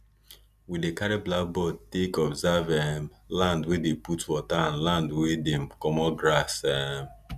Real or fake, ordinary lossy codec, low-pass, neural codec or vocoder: real; none; 14.4 kHz; none